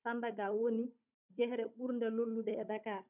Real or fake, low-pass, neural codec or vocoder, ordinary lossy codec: fake; 3.6 kHz; codec, 16 kHz, 16 kbps, FunCodec, trained on Chinese and English, 50 frames a second; none